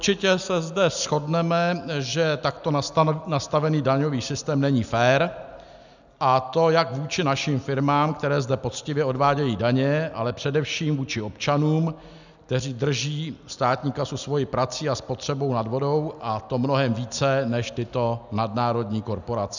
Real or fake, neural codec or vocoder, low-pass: real; none; 7.2 kHz